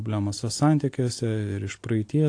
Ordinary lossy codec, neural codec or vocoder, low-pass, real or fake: AAC, 48 kbps; none; 9.9 kHz; real